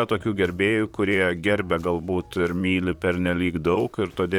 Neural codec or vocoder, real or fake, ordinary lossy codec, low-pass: vocoder, 44.1 kHz, 128 mel bands, Pupu-Vocoder; fake; Opus, 64 kbps; 19.8 kHz